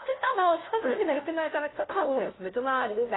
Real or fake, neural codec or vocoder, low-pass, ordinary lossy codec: fake; codec, 16 kHz, 0.5 kbps, FunCodec, trained on LibriTTS, 25 frames a second; 7.2 kHz; AAC, 16 kbps